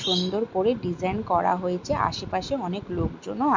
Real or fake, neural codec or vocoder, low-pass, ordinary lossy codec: real; none; 7.2 kHz; MP3, 64 kbps